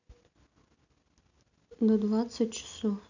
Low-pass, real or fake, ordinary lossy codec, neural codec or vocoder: 7.2 kHz; real; none; none